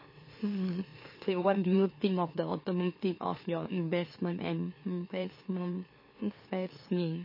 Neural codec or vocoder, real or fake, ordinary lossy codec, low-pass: autoencoder, 44.1 kHz, a latent of 192 numbers a frame, MeloTTS; fake; MP3, 24 kbps; 5.4 kHz